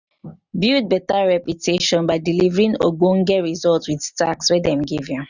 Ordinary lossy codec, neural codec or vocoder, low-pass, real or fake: none; none; 7.2 kHz; real